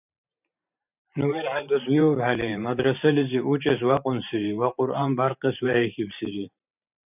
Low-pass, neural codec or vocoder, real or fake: 3.6 kHz; vocoder, 44.1 kHz, 128 mel bands every 512 samples, BigVGAN v2; fake